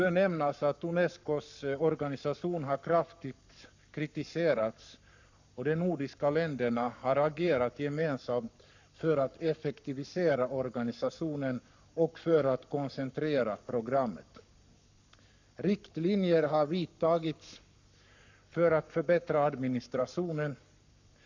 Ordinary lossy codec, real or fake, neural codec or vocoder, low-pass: none; fake; codec, 44.1 kHz, 7.8 kbps, Pupu-Codec; 7.2 kHz